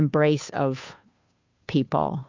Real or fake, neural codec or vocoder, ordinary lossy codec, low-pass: fake; codec, 16 kHz, 2 kbps, FunCodec, trained on Chinese and English, 25 frames a second; MP3, 48 kbps; 7.2 kHz